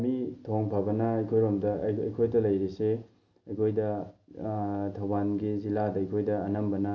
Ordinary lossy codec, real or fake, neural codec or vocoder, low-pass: none; real; none; 7.2 kHz